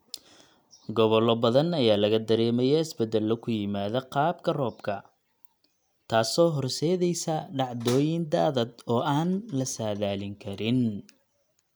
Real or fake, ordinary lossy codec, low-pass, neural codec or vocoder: real; none; none; none